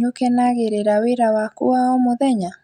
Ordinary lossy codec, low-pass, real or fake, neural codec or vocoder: none; 19.8 kHz; real; none